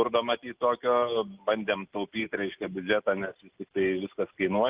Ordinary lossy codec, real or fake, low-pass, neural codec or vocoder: Opus, 32 kbps; real; 3.6 kHz; none